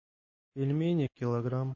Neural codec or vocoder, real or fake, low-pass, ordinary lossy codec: none; real; 7.2 kHz; MP3, 32 kbps